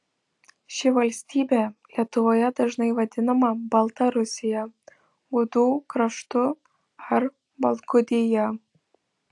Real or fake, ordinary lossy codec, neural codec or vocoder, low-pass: real; AAC, 64 kbps; none; 10.8 kHz